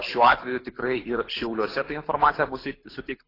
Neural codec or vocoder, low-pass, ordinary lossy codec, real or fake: codec, 24 kHz, 6 kbps, HILCodec; 5.4 kHz; AAC, 24 kbps; fake